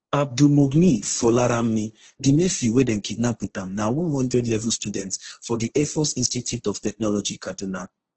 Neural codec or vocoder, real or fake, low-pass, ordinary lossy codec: codec, 16 kHz, 1.1 kbps, Voila-Tokenizer; fake; 7.2 kHz; Opus, 16 kbps